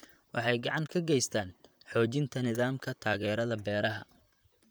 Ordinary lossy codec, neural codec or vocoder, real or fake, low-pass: none; vocoder, 44.1 kHz, 128 mel bands, Pupu-Vocoder; fake; none